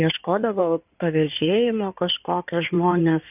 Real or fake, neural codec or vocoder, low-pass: fake; codec, 16 kHz in and 24 kHz out, 2.2 kbps, FireRedTTS-2 codec; 3.6 kHz